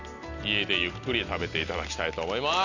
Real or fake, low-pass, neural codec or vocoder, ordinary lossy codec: real; 7.2 kHz; none; none